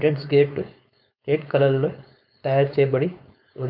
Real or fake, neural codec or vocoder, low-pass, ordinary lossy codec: fake; codec, 16 kHz, 4.8 kbps, FACodec; 5.4 kHz; MP3, 48 kbps